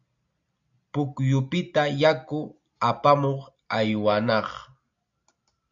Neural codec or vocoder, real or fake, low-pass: none; real; 7.2 kHz